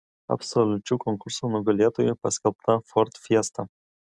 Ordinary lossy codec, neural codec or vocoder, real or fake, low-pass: Opus, 64 kbps; none; real; 10.8 kHz